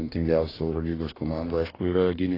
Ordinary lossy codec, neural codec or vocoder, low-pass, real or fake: AAC, 24 kbps; codec, 44.1 kHz, 2.6 kbps, DAC; 5.4 kHz; fake